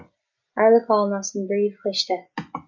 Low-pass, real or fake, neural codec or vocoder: 7.2 kHz; real; none